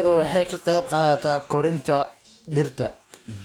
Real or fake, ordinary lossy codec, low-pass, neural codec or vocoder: fake; none; 19.8 kHz; codec, 44.1 kHz, 2.6 kbps, DAC